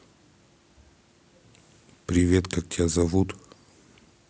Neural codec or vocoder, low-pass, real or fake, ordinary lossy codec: none; none; real; none